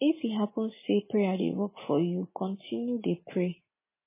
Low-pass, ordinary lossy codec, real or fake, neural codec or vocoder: 3.6 kHz; MP3, 16 kbps; real; none